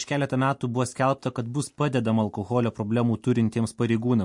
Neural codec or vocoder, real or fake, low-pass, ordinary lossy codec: none; real; 10.8 kHz; MP3, 48 kbps